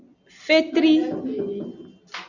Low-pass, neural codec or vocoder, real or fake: 7.2 kHz; none; real